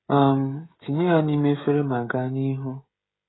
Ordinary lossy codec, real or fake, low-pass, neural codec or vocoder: AAC, 16 kbps; fake; 7.2 kHz; codec, 16 kHz, 16 kbps, FreqCodec, smaller model